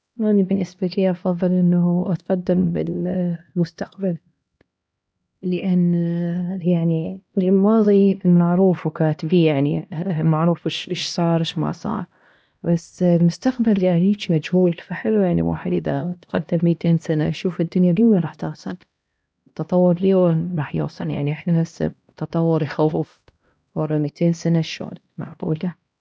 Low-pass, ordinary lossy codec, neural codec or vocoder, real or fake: none; none; codec, 16 kHz, 1 kbps, X-Codec, HuBERT features, trained on LibriSpeech; fake